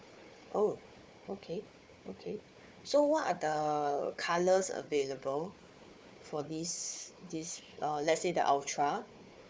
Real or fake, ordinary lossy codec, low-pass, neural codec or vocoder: fake; none; none; codec, 16 kHz, 4 kbps, FunCodec, trained on Chinese and English, 50 frames a second